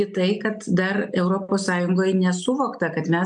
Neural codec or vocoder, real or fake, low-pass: none; real; 10.8 kHz